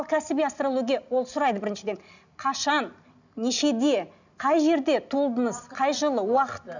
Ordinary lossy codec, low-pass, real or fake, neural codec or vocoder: none; 7.2 kHz; real; none